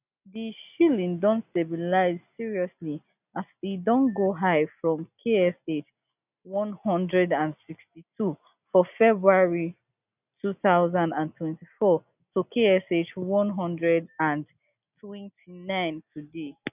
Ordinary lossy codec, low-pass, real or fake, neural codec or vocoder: none; 3.6 kHz; real; none